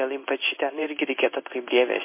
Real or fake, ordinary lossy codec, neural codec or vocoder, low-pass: fake; MP3, 24 kbps; codec, 16 kHz in and 24 kHz out, 1 kbps, XY-Tokenizer; 3.6 kHz